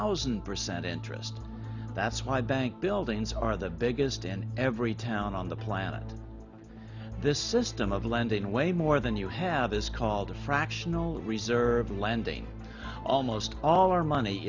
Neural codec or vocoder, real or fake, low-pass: none; real; 7.2 kHz